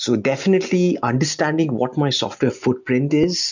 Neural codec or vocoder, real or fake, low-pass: none; real; 7.2 kHz